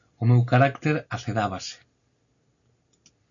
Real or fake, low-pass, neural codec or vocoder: real; 7.2 kHz; none